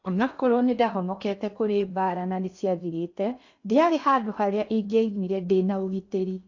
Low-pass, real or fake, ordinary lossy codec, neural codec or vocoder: 7.2 kHz; fake; AAC, 48 kbps; codec, 16 kHz in and 24 kHz out, 0.8 kbps, FocalCodec, streaming, 65536 codes